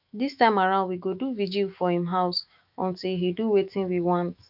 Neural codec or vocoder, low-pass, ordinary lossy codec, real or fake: autoencoder, 48 kHz, 128 numbers a frame, DAC-VAE, trained on Japanese speech; 5.4 kHz; none; fake